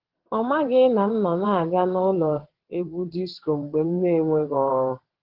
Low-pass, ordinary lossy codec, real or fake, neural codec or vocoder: 5.4 kHz; Opus, 16 kbps; fake; vocoder, 22.05 kHz, 80 mel bands, WaveNeXt